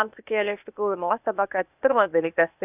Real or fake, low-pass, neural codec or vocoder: fake; 3.6 kHz; codec, 16 kHz, 0.8 kbps, ZipCodec